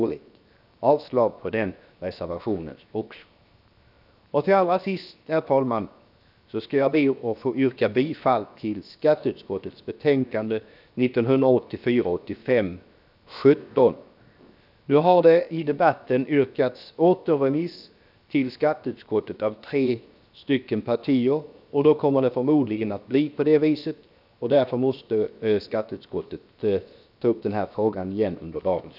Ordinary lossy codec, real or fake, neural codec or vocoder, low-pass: none; fake; codec, 16 kHz, 0.7 kbps, FocalCodec; 5.4 kHz